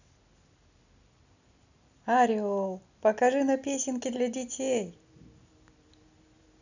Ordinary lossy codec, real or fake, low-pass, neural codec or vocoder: none; real; 7.2 kHz; none